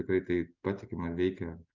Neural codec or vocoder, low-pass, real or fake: none; 7.2 kHz; real